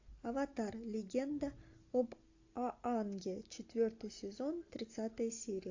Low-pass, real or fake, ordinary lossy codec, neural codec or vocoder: 7.2 kHz; real; AAC, 48 kbps; none